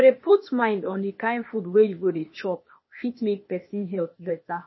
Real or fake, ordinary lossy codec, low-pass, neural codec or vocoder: fake; MP3, 24 kbps; 7.2 kHz; codec, 16 kHz, 0.8 kbps, ZipCodec